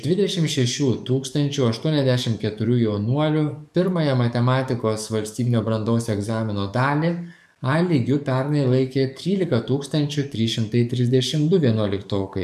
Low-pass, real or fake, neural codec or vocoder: 14.4 kHz; fake; codec, 44.1 kHz, 7.8 kbps, DAC